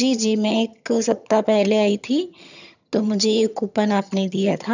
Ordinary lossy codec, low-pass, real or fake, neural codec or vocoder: none; 7.2 kHz; fake; vocoder, 22.05 kHz, 80 mel bands, HiFi-GAN